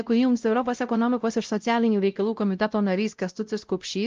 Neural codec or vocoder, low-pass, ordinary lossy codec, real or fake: codec, 16 kHz, 0.5 kbps, X-Codec, WavLM features, trained on Multilingual LibriSpeech; 7.2 kHz; Opus, 32 kbps; fake